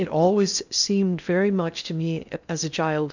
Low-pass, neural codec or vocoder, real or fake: 7.2 kHz; codec, 16 kHz in and 24 kHz out, 0.6 kbps, FocalCodec, streaming, 2048 codes; fake